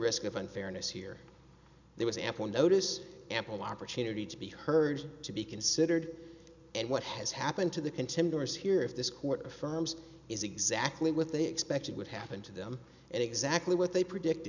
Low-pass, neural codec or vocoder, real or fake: 7.2 kHz; none; real